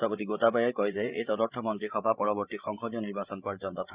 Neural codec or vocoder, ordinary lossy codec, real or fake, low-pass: codec, 16 kHz, 16 kbps, FreqCodec, larger model; Opus, 64 kbps; fake; 3.6 kHz